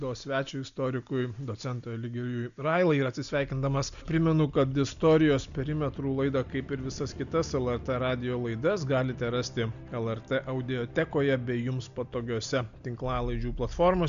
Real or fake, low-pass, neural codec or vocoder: real; 7.2 kHz; none